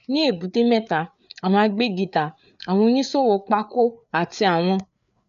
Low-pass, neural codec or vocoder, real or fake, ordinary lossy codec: 7.2 kHz; codec, 16 kHz, 4 kbps, FreqCodec, larger model; fake; none